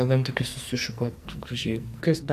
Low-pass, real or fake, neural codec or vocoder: 14.4 kHz; fake; codec, 44.1 kHz, 2.6 kbps, SNAC